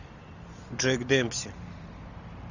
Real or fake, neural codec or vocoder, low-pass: real; none; 7.2 kHz